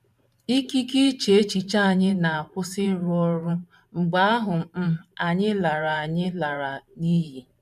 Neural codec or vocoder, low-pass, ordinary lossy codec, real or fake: vocoder, 44.1 kHz, 128 mel bands every 512 samples, BigVGAN v2; 14.4 kHz; none; fake